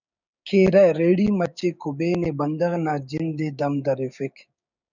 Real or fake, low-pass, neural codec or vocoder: fake; 7.2 kHz; codec, 16 kHz, 6 kbps, DAC